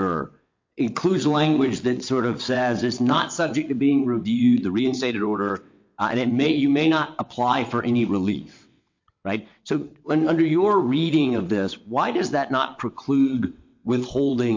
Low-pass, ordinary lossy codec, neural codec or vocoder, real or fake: 7.2 kHz; MP3, 48 kbps; vocoder, 22.05 kHz, 80 mel bands, WaveNeXt; fake